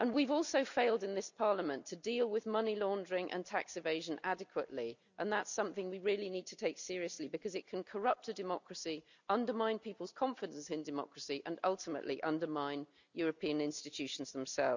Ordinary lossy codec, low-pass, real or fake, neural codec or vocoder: none; 7.2 kHz; real; none